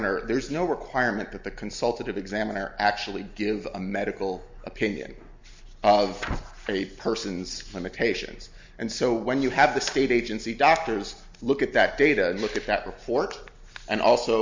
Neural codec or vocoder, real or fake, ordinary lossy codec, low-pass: none; real; MP3, 64 kbps; 7.2 kHz